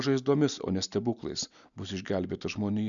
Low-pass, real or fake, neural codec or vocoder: 7.2 kHz; real; none